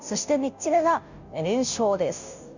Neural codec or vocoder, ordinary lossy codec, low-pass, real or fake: codec, 16 kHz, 0.5 kbps, FunCodec, trained on Chinese and English, 25 frames a second; none; 7.2 kHz; fake